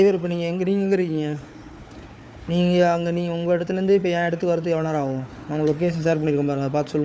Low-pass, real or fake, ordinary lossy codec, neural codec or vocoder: none; fake; none; codec, 16 kHz, 4 kbps, FunCodec, trained on Chinese and English, 50 frames a second